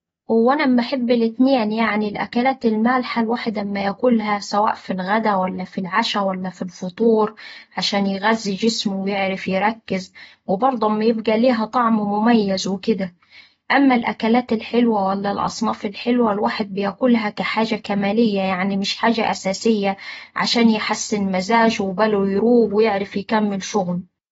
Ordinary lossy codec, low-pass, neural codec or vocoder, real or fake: AAC, 24 kbps; 19.8 kHz; none; real